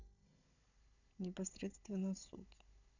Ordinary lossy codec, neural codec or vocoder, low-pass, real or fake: none; codec, 16 kHz, 16 kbps, FunCodec, trained on Chinese and English, 50 frames a second; 7.2 kHz; fake